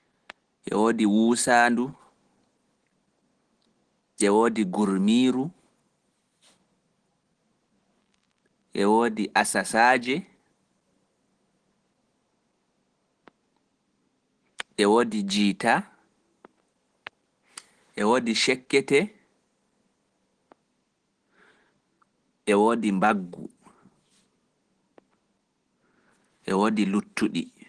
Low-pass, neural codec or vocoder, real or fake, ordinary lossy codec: 9.9 kHz; none; real; Opus, 16 kbps